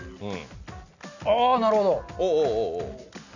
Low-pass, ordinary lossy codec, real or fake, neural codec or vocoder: 7.2 kHz; none; real; none